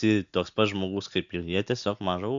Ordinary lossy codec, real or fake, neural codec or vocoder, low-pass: MP3, 64 kbps; real; none; 7.2 kHz